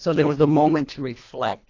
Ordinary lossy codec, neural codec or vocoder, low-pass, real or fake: AAC, 48 kbps; codec, 24 kHz, 1.5 kbps, HILCodec; 7.2 kHz; fake